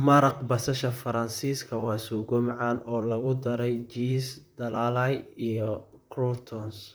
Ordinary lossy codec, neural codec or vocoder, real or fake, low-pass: none; vocoder, 44.1 kHz, 128 mel bands, Pupu-Vocoder; fake; none